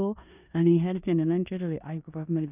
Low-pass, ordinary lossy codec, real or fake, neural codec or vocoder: 3.6 kHz; none; fake; codec, 16 kHz in and 24 kHz out, 0.9 kbps, LongCat-Audio-Codec, four codebook decoder